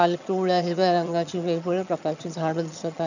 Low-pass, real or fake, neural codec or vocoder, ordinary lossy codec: 7.2 kHz; fake; vocoder, 22.05 kHz, 80 mel bands, HiFi-GAN; none